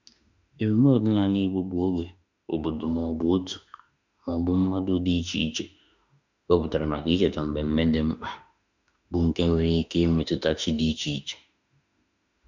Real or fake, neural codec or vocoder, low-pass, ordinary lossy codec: fake; autoencoder, 48 kHz, 32 numbers a frame, DAC-VAE, trained on Japanese speech; 7.2 kHz; none